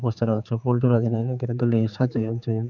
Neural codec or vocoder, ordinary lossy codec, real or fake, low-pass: codec, 16 kHz, 4 kbps, X-Codec, HuBERT features, trained on general audio; none; fake; 7.2 kHz